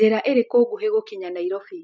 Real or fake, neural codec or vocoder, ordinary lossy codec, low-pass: real; none; none; none